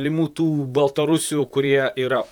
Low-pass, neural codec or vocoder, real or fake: 19.8 kHz; vocoder, 44.1 kHz, 128 mel bands, Pupu-Vocoder; fake